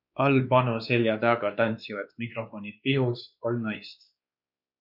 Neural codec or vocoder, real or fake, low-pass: codec, 16 kHz, 2 kbps, X-Codec, WavLM features, trained on Multilingual LibriSpeech; fake; 5.4 kHz